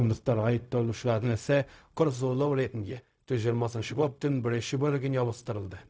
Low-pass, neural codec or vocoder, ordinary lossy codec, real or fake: none; codec, 16 kHz, 0.4 kbps, LongCat-Audio-Codec; none; fake